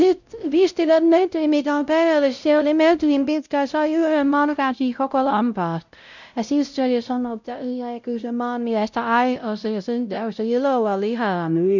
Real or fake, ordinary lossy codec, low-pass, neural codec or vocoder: fake; none; 7.2 kHz; codec, 16 kHz, 0.5 kbps, X-Codec, WavLM features, trained on Multilingual LibriSpeech